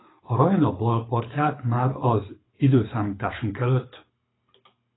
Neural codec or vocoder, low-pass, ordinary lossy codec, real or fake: codec, 24 kHz, 6 kbps, HILCodec; 7.2 kHz; AAC, 16 kbps; fake